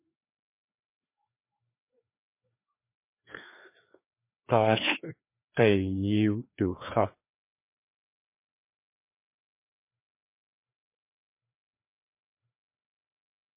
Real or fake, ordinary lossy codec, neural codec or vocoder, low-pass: fake; MP3, 24 kbps; codec, 16 kHz, 2 kbps, FreqCodec, larger model; 3.6 kHz